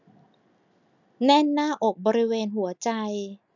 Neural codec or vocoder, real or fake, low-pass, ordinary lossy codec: none; real; 7.2 kHz; none